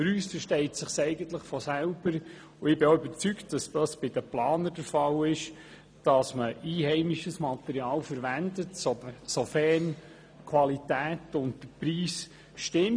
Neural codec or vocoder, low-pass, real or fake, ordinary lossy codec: none; none; real; none